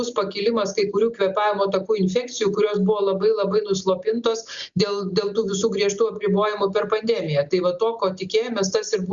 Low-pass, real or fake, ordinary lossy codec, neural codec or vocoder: 7.2 kHz; real; Opus, 64 kbps; none